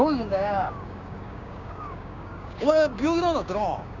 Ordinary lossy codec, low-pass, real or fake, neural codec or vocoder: none; 7.2 kHz; fake; codec, 16 kHz, 6 kbps, DAC